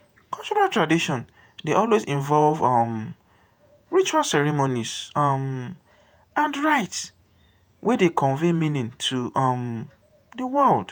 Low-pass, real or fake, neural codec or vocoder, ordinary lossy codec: none; fake; vocoder, 48 kHz, 128 mel bands, Vocos; none